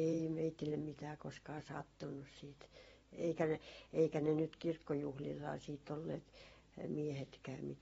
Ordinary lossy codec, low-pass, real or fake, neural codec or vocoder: AAC, 24 kbps; 19.8 kHz; fake; vocoder, 44.1 kHz, 128 mel bands every 512 samples, BigVGAN v2